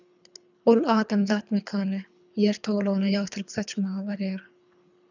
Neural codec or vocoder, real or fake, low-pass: codec, 24 kHz, 3 kbps, HILCodec; fake; 7.2 kHz